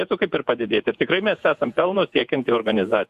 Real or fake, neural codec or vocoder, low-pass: fake; vocoder, 48 kHz, 128 mel bands, Vocos; 14.4 kHz